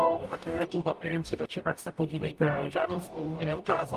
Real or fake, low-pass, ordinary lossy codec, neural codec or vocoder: fake; 14.4 kHz; Opus, 32 kbps; codec, 44.1 kHz, 0.9 kbps, DAC